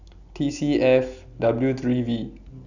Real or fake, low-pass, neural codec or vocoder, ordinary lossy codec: real; 7.2 kHz; none; none